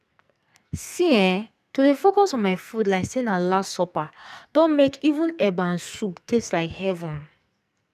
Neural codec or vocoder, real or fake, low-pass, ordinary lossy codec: codec, 44.1 kHz, 2.6 kbps, SNAC; fake; 14.4 kHz; none